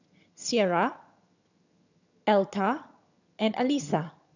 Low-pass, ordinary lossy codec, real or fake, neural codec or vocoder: 7.2 kHz; none; fake; vocoder, 22.05 kHz, 80 mel bands, HiFi-GAN